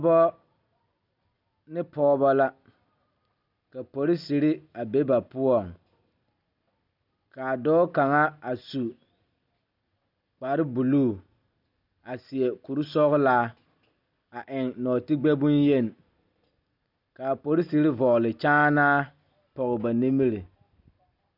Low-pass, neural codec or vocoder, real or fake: 5.4 kHz; none; real